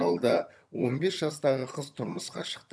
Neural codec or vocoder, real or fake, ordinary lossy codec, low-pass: vocoder, 22.05 kHz, 80 mel bands, HiFi-GAN; fake; none; none